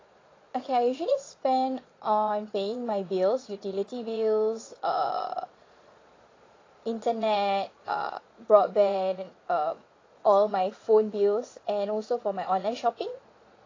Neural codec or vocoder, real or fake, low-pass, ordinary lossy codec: vocoder, 22.05 kHz, 80 mel bands, Vocos; fake; 7.2 kHz; AAC, 32 kbps